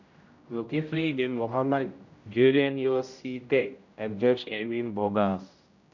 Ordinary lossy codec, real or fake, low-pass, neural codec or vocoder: none; fake; 7.2 kHz; codec, 16 kHz, 0.5 kbps, X-Codec, HuBERT features, trained on general audio